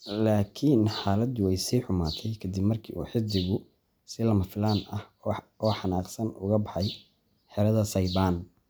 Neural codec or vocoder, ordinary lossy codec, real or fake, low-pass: none; none; real; none